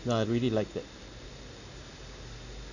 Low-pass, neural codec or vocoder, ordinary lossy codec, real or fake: 7.2 kHz; none; none; real